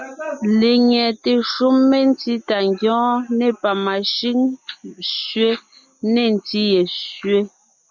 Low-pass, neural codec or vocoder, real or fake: 7.2 kHz; none; real